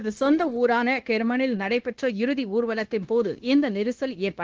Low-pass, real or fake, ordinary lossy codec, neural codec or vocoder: 7.2 kHz; fake; Opus, 16 kbps; codec, 16 kHz, 0.9 kbps, LongCat-Audio-Codec